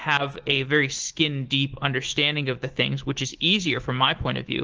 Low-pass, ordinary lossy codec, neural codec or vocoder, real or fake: 7.2 kHz; Opus, 16 kbps; autoencoder, 48 kHz, 128 numbers a frame, DAC-VAE, trained on Japanese speech; fake